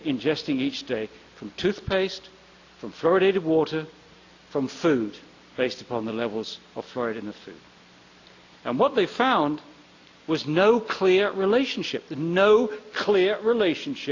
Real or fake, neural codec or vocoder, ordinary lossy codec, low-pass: real; none; AAC, 48 kbps; 7.2 kHz